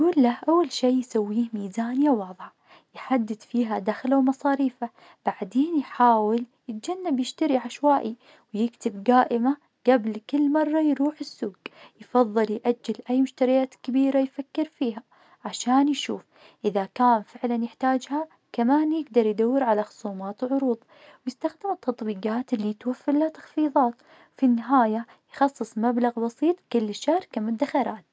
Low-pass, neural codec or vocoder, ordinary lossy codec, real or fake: none; none; none; real